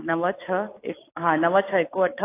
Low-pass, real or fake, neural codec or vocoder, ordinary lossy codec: 3.6 kHz; real; none; AAC, 24 kbps